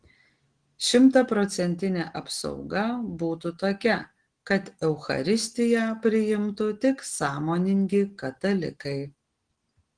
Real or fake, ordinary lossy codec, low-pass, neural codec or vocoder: real; Opus, 16 kbps; 9.9 kHz; none